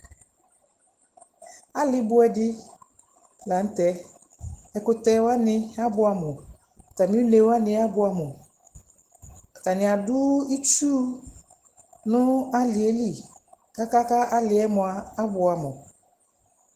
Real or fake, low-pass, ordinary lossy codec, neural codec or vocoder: real; 14.4 kHz; Opus, 16 kbps; none